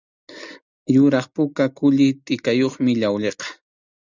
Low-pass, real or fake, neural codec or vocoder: 7.2 kHz; real; none